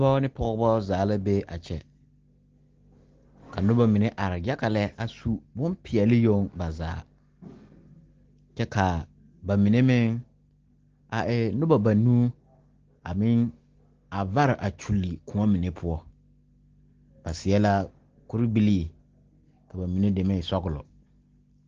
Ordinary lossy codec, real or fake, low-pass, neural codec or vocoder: Opus, 16 kbps; real; 7.2 kHz; none